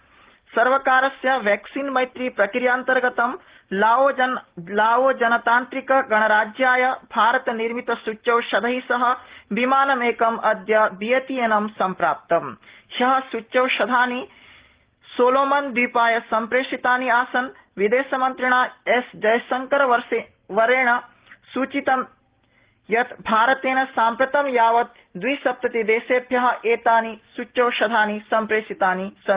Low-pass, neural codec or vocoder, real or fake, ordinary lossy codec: 3.6 kHz; none; real; Opus, 16 kbps